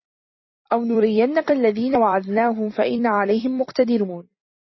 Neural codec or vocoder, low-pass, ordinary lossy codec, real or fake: vocoder, 44.1 kHz, 128 mel bands every 256 samples, BigVGAN v2; 7.2 kHz; MP3, 24 kbps; fake